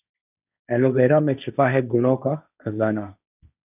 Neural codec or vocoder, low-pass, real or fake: codec, 16 kHz, 1.1 kbps, Voila-Tokenizer; 3.6 kHz; fake